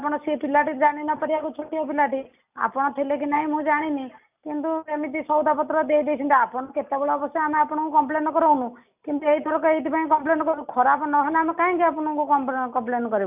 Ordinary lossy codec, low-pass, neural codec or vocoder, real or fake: none; 3.6 kHz; none; real